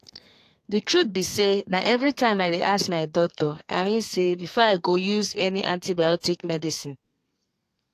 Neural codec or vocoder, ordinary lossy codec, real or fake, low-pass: codec, 32 kHz, 1.9 kbps, SNAC; AAC, 64 kbps; fake; 14.4 kHz